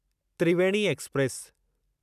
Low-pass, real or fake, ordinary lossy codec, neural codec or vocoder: 14.4 kHz; real; none; none